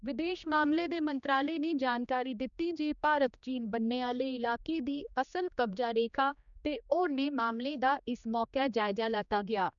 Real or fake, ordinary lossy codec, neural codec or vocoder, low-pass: fake; none; codec, 16 kHz, 2 kbps, X-Codec, HuBERT features, trained on general audio; 7.2 kHz